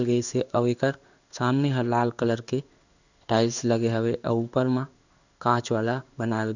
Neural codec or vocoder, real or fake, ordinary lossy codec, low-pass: codec, 16 kHz in and 24 kHz out, 1 kbps, XY-Tokenizer; fake; none; 7.2 kHz